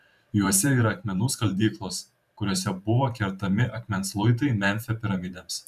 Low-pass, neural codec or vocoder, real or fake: 14.4 kHz; vocoder, 44.1 kHz, 128 mel bands every 512 samples, BigVGAN v2; fake